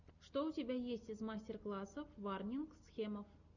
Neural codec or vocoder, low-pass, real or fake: none; 7.2 kHz; real